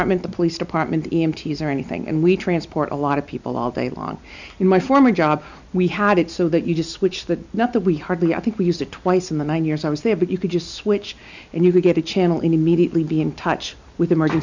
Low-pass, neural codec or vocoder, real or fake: 7.2 kHz; none; real